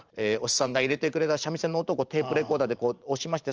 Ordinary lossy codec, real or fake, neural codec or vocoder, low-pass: Opus, 24 kbps; fake; vocoder, 44.1 kHz, 128 mel bands every 512 samples, BigVGAN v2; 7.2 kHz